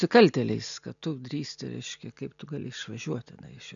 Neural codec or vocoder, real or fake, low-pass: none; real; 7.2 kHz